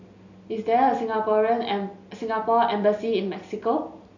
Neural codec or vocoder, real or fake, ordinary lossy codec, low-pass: none; real; none; 7.2 kHz